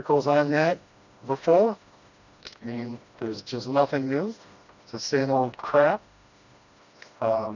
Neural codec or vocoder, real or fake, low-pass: codec, 16 kHz, 1 kbps, FreqCodec, smaller model; fake; 7.2 kHz